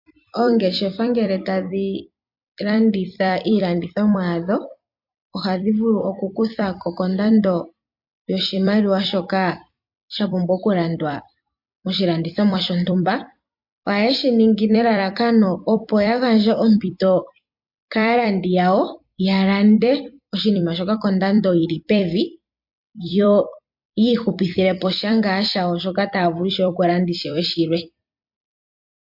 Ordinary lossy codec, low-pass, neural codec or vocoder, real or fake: MP3, 48 kbps; 5.4 kHz; vocoder, 44.1 kHz, 128 mel bands every 256 samples, BigVGAN v2; fake